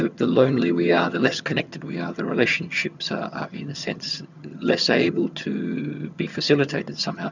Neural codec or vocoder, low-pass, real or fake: vocoder, 22.05 kHz, 80 mel bands, HiFi-GAN; 7.2 kHz; fake